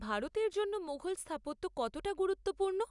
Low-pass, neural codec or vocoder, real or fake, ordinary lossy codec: 10.8 kHz; none; real; none